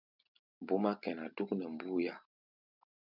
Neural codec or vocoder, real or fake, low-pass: none; real; 5.4 kHz